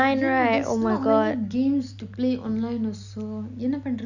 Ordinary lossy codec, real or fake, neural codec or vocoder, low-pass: none; real; none; 7.2 kHz